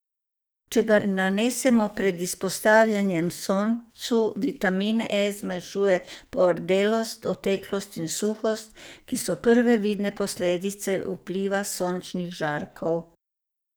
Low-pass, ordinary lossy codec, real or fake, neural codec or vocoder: none; none; fake; codec, 44.1 kHz, 2.6 kbps, SNAC